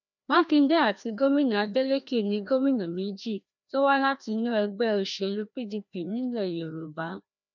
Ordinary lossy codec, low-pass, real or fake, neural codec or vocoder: none; 7.2 kHz; fake; codec, 16 kHz, 1 kbps, FreqCodec, larger model